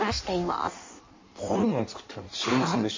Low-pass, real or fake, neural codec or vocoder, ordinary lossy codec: 7.2 kHz; fake; codec, 16 kHz in and 24 kHz out, 1.1 kbps, FireRedTTS-2 codec; MP3, 32 kbps